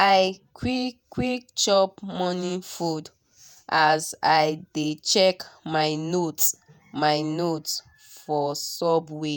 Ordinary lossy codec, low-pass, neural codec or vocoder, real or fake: none; none; vocoder, 48 kHz, 128 mel bands, Vocos; fake